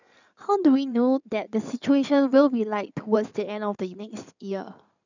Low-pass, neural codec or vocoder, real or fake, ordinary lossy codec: 7.2 kHz; codec, 16 kHz in and 24 kHz out, 2.2 kbps, FireRedTTS-2 codec; fake; none